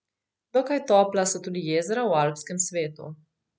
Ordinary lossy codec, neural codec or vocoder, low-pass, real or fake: none; none; none; real